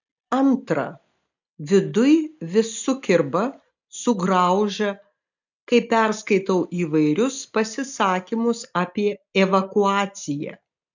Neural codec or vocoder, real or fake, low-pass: none; real; 7.2 kHz